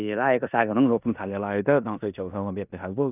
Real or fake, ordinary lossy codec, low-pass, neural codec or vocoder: fake; none; 3.6 kHz; codec, 16 kHz in and 24 kHz out, 0.9 kbps, LongCat-Audio-Codec, four codebook decoder